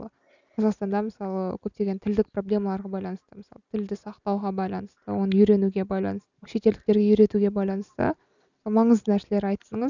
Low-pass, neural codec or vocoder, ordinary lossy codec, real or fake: 7.2 kHz; none; none; real